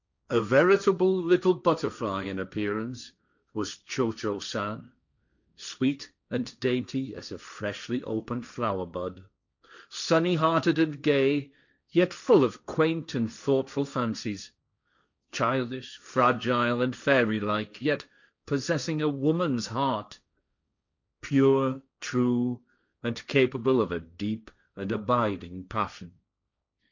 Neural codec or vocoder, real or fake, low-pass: codec, 16 kHz, 1.1 kbps, Voila-Tokenizer; fake; 7.2 kHz